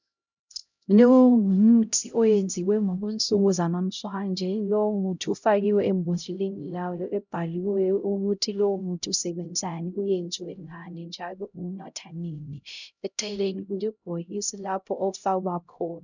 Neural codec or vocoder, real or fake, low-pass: codec, 16 kHz, 0.5 kbps, X-Codec, HuBERT features, trained on LibriSpeech; fake; 7.2 kHz